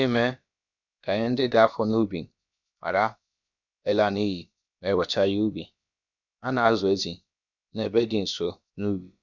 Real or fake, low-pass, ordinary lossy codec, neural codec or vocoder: fake; 7.2 kHz; none; codec, 16 kHz, about 1 kbps, DyCAST, with the encoder's durations